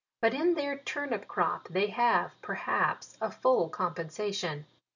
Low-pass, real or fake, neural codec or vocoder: 7.2 kHz; real; none